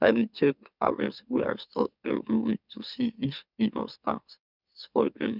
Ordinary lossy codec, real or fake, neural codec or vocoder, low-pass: none; fake; autoencoder, 44.1 kHz, a latent of 192 numbers a frame, MeloTTS; 5.4 kHz